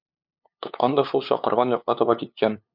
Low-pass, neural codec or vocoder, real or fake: 5.4 kHz; codec, 16 kHz, 2 kbps, FunCodec, trained on LibriTTS, 25 frames a second; fake